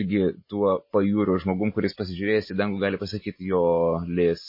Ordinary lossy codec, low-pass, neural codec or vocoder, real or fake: MP3, 24 kbps; 5.4 kHz; none; real